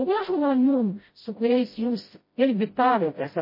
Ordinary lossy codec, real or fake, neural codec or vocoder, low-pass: MP3, 24 kbps; fake; codec, 16 kHz, 0.5 kbps, FreqCodec, smaller model; 5.4 kHz